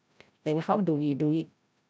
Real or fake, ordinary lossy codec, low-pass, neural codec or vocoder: fake; none; none; codec, 16 kHz, 0.5 kbps, FreqCodec, larger model